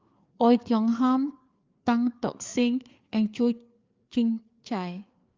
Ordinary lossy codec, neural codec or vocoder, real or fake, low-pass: Opus, 32 kbps; codec, 16 kHz, 4 kbps, FreqCodec, larger model; fake; 7.2 kHz